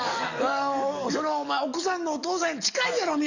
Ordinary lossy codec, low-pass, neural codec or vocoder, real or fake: none; 7.2 kHz; codec, 44.1 kHz, 7.8 kbps, DAC; fake